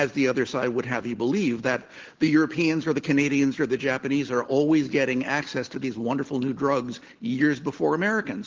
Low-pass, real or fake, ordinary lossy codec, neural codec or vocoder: 7.2 kHz; real; Opus, 16 kbps; none